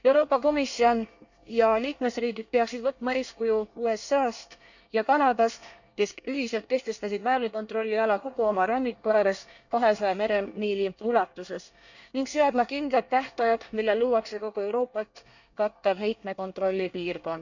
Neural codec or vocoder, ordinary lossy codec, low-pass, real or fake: codec, 24 kHz, 1 kbps, SNAC; none; 7.2 kHz; fake